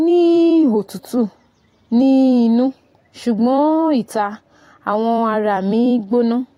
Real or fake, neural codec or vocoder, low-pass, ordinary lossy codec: fake; vocoder, 44.1 kHz, 128 mel bands every 256 samples, BigVGAN v2; 19.8 kHz; AAC, 48 kbps